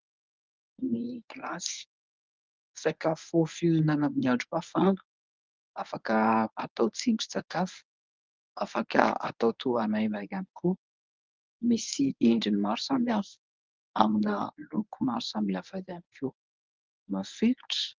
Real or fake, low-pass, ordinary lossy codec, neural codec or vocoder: fake; 7.2 kHz; Opus, 24 kbps; codec, 24 kHz, 0.9 kbps, WavTokenizer, medium speech release version 1